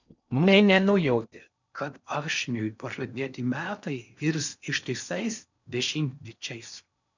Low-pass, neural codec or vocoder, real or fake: 7.2 kHz; codec, 16 kHz in and 24 kHz out, 0.6 kbps, FocalCodec, streaming, 4096 codes; fake